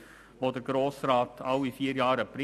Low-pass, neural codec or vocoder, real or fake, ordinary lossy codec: 14.4 kHz; none; real; none